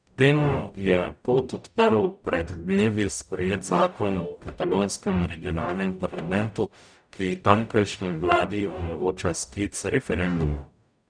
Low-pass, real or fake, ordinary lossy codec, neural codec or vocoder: 9.9 kHz; fake; none; codec, 44.1 kHz, 0.9 kbps, DAC